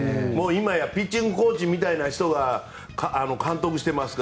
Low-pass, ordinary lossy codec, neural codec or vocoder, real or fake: none; none; none; real